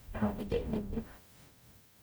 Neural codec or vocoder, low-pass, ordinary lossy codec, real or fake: codec, 44.1 kHz, 0.9 kbps, DAC; none; none; fake